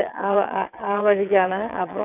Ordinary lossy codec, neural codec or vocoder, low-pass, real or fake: none; vocoder, 22.05 kHz, 80 mel bands, WaveNeXt; 3.6 kHz; fake